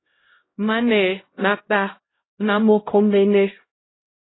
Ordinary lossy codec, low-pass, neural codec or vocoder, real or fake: AAC, 16 kbps; 7.2 kHz; codec, 16 kHz, 0.5 kbps, X-Codec, HuBERT features, trained on LibriSpeech; fake